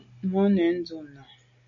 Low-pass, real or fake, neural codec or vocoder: 7.2 kHz; real; none